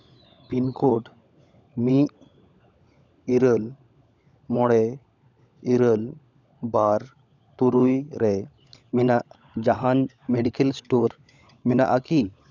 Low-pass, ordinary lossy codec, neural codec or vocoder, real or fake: 7.2 kHz; none; codec, 16 kHz, 16 kbps, FunCodec, trained on LibriTTS, 50 frames a second; fake